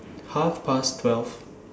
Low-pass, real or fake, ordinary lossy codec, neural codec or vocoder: none; real; none; none